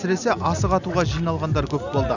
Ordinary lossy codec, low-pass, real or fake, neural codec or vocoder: none; 7.2 kHz; real; none